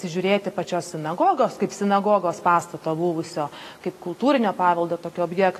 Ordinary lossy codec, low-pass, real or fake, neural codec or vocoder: AAC, 48 kbps; 14.4 kHz; real; none